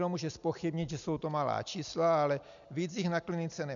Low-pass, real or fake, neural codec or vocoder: 7.2 kHz; real; none